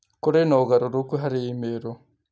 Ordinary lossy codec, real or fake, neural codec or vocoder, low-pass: none; real; none; none